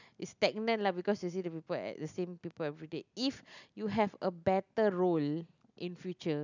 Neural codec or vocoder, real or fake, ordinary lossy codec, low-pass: none; real; none; 7.2 kHz